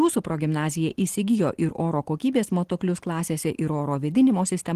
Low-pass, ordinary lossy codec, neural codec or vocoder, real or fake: 14.4 kHz; Opus, 16 kbps; none; real